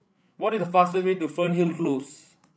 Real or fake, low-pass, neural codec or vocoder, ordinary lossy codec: fake; none; codec, 16 kHz, 8 kbps, FreqCodec, larger model; none